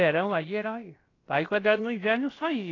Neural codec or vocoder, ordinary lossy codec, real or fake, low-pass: codec, 16 kHz, about 1 kbps, DyCAST, with the encoder's durations; AAC, 32 kbps; fake; 7.2 kHz